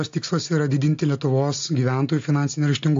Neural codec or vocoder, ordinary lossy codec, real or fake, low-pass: none; AAC, 48 kbps; real; 7.2 kHz